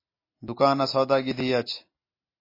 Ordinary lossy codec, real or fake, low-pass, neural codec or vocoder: MP3, 24 kbps; real; 5.4 kHz; none